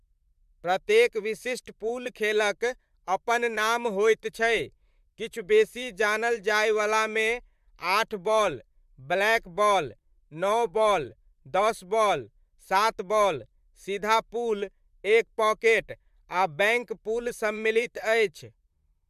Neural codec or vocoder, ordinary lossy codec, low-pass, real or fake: vocoder, 44.1 kHz, 128 mel bands, Pupu-Vocoder; MP3, 96 kbps; 14.4 kHz; fake